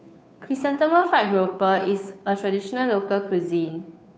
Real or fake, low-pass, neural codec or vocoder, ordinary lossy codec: fake; none; codec, 16 kHz, 2 kbps, FunCodec, trained on Chinese and English, 25 frames a second; none